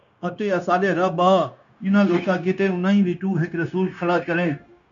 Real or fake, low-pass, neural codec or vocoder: fake; 7.2 kHz; codec, 16 kHz, 0.9 kbps, LongCat-Audio-Codec